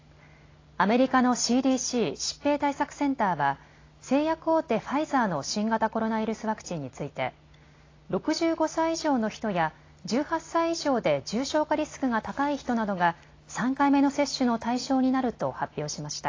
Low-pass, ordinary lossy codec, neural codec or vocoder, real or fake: 7.2 kHz; AAC, 32 kbps; none; real